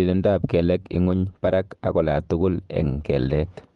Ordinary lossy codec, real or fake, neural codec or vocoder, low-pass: Opus, 32 kbps; fake; vocoder, 24 kHz, 100 mel bands, Vocos; 10.8 kHz